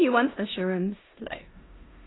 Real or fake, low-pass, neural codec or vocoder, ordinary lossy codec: fake; 7.2 kHz; codec, 16 kHz in and 24 kHz out, 0.9 kbps, LongCat-Audio-Codec, four codebook decoder; AAC, 16 kbps